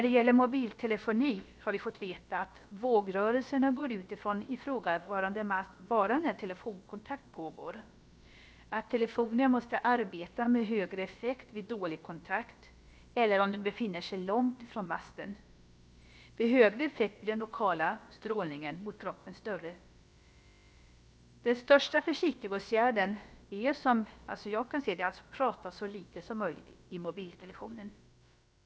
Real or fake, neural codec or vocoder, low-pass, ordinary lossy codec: fake; codec, 16 kHz, about 1 kbps, DyCAST, with the encoder's durations; none; none